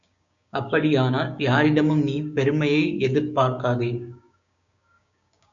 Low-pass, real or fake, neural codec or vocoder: 7.2 kHz; fake; codec, 16 kHz, 6 kbps, DAC